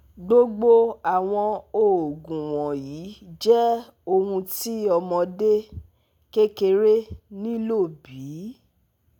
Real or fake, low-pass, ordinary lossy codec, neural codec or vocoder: real; 19.8 kHz; none; none